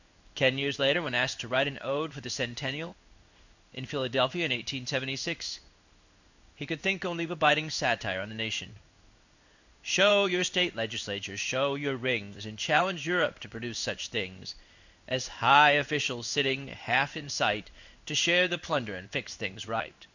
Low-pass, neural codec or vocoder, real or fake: 7.2 kHz; codec, 16 kHz in and 24 kHz out, 1 kbps, XY-Tokenizer; fake